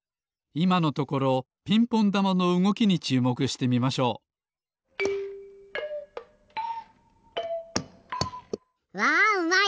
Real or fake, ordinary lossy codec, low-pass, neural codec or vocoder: real; none; none; none